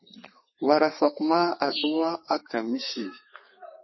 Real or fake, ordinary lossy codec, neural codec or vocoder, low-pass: fake; MP3, 24 kbps; codec, 32 kHz, 1.9 kbps, SNAC; 7.2 kHz